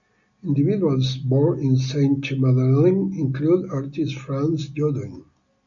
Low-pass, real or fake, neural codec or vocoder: 7.2 kHz; real; none